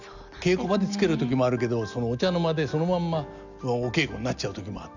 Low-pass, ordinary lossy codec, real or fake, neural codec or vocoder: 7.2 kHz; none; real; none